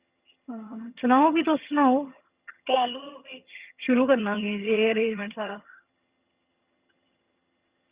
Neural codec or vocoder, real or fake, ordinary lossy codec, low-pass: vocoder, 22.05 kHz, 80 mel bands, HiFi-GAN; fake; Opus, 64 kbps; 3.6 kHz